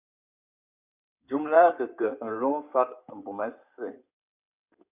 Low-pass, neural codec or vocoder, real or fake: 3.6 kHz; codec, 16 kHz in and 24 kHz out, 2.2 kbps, FireRedTTS-2 codec; fake